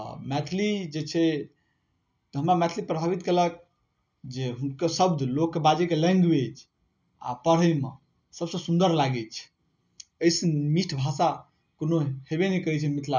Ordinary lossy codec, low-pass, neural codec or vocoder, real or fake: none; 7.2 kHz; none; real